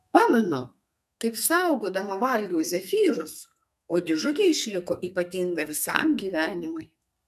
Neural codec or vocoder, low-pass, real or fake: codec, 32 kHz, 1.9 kbps, SNAC; 14.4 kHz; fake